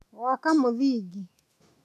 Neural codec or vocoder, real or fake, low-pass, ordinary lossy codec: autoencoder, 48 kHz, 128 numbers a frame, DAC-VAE, trained on Japanese speech; fake; 14.4 kHz; AAC, 64 kbps